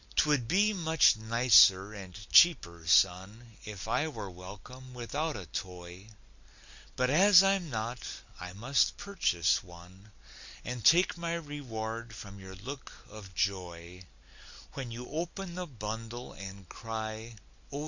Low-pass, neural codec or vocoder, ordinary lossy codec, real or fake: 7.2 kHz; none; Opus, 64 kbps; real